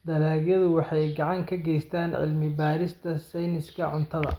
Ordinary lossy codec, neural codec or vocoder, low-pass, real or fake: Opus, 32 kbps; none; 19.8 kHz; real